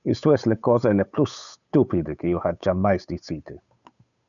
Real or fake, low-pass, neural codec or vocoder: fake; 7.2 kHz; codec, 16 kHz, 8 kbps, FunCodec, trained on Chinese and English, 25 frames a second